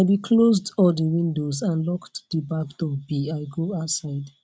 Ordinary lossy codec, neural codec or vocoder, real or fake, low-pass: none; none; real; none